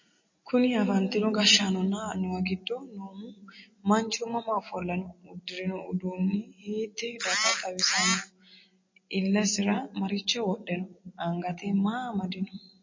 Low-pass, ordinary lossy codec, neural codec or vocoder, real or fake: 7.2 kHz; MP3, 32 kbps; none; real